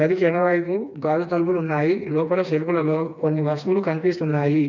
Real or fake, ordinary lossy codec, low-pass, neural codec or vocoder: fake; none; 7.2 kHz; codec, 16 kHz, 2 kbps, FreqCodec, smaller model